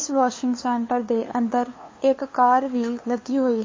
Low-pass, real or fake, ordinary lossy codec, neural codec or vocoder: 7.2 kHz; fake; MP3, 32 kbps; codec, 16 kHz, 2 kbps, FunCodec, trained on LibriTTS, 25 frames a second